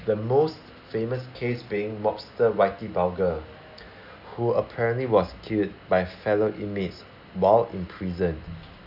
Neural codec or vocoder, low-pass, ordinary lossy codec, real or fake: none; 5.4 kHz; none; real